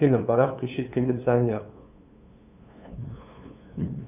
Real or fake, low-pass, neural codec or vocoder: fake; 3.6 kHz; codec, 16 kHz, 2 kbps, FunCodec, trained on LibriTTS, 25 frames a second